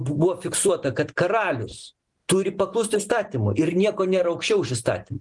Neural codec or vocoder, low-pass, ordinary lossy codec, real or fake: none; 10.8 kHz; Opus, 24 kbps; real